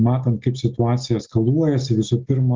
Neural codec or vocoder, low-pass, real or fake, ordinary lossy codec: none; 7.2 kHz; real; Opus, 32 kbps